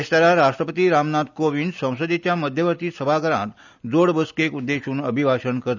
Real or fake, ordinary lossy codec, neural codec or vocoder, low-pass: real; none; none; 7.2 kHz